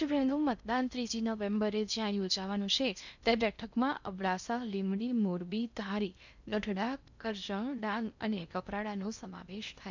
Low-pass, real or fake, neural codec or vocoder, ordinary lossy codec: 7.2 kHz; fake; codec, 16 kHz in and 24 kHz out, 0.6 kbps, FocalCodec, streaming, 4096 codes; none